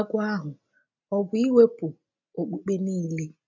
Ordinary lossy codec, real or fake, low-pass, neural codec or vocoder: none; real; 7.2 kHz; none